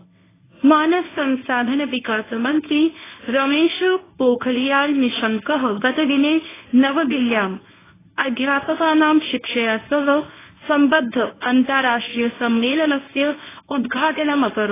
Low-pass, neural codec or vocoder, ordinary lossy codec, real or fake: 3.6 kHz; codec, 24 kHz, 0.9 kbps, WavTokenizer, medium speech release version 1; AAC, 16 kbps; fake